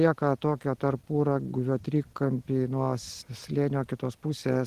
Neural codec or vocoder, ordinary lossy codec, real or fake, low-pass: none; Opus, 16 kbps; real; 14.4 kHz